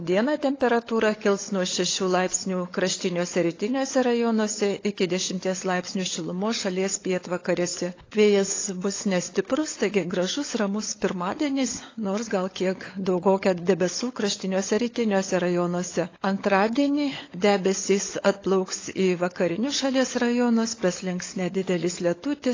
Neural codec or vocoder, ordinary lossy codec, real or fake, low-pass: codec, 16 kHz, 16 kbps, FunCodec, trained on LibriTTS, 50 frames a second; AAC, 32 kbps; fake; 7.2 kHz